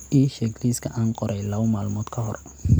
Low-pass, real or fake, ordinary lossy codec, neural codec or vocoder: none; real; none; none